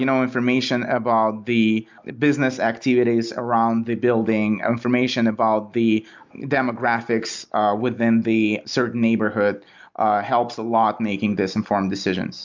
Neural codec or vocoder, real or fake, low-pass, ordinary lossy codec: none; real; 7.2 kHz; MP3, 64 kbps